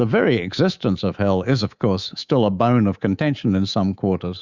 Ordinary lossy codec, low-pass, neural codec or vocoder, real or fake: Opus, 64 kbps; 7.2 kHz; codec, 24 kHz, 3.1 kbps, DualCodec; fake